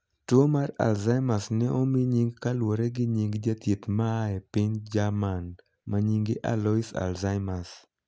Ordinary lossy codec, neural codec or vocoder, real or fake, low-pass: none; none; real; none